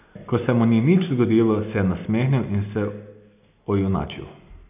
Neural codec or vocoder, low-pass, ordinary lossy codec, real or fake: none; 3.6 kHz; none; real